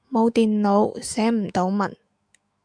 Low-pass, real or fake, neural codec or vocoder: 9.9 kHz; fake; autoencoder, 48 kHz, 128 numbers a frame, DAC-VAE, trained on Japanese speech